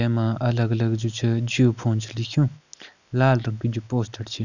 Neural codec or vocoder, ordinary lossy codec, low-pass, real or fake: none; none; 7.2 kHz; real